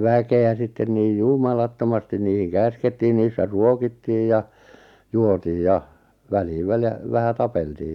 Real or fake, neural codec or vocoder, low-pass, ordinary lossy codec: real; none; 19.8 kHz; none